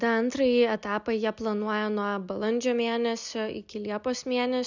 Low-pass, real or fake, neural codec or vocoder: 7.2 kHz; real; none